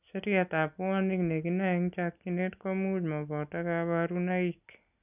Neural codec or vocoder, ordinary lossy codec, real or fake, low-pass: none; none; real; 3.6 kHz